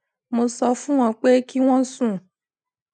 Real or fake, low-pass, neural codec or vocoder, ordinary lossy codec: real; 9.9 kHz; none; none